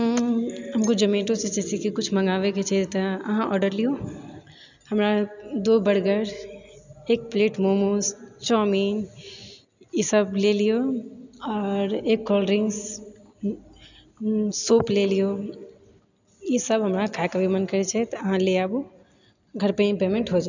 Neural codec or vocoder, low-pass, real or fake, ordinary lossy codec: none; 7.2 kHz; real; none